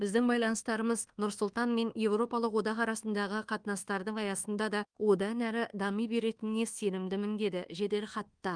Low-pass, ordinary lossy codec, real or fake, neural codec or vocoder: 9.9 kHz; Opus, 24 kbps; fake; codec, 24 kHz, 1.2 kbps, DualCodec